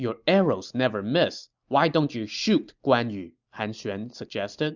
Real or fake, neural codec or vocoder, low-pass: real; none; 7.2 kHz